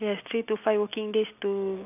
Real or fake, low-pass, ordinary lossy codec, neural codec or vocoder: real; 3.6 kHz; none; none